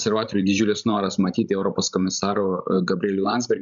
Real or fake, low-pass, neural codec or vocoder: fake; 7.2 kHz; codec, 16 kHz, 16 kbps, FreqCodec, larger model